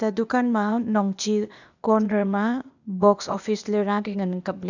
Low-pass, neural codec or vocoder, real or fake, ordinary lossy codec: 7.2 kHz; codec, 16 kHz, 0.8 kbps, ZipCodec; fake; none